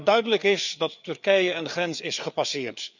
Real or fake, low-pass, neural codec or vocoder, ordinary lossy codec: fake; 7.2 kHz; codec, 16 kHz, 4 kbps, FreqCodec, larger model; none